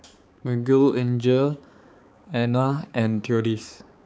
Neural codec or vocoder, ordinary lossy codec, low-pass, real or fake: codec, 16 kHz, 4 kbps, X-Codec, HuBERT features, trained on balanced general audio; none; none; fake